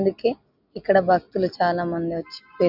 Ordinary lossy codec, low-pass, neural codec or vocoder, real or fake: none; 5.4 kHz; none; real